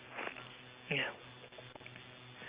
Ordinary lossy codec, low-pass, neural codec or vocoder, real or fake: Opus, 64 kbps; 3.6 kHz; none; real